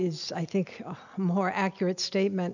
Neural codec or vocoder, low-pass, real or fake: none; 7.2 kHz; real